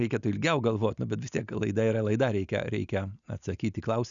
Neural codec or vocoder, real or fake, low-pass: none; real; 7.2 kHz